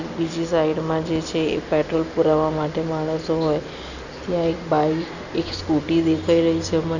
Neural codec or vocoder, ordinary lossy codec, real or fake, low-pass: none; none; real; 7.2 kHz